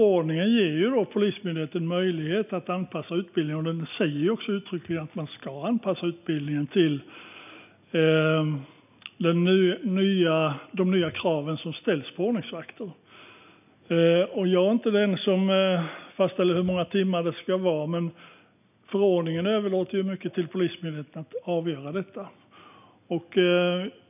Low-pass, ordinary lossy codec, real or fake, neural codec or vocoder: 3.6 kHz; none; real; none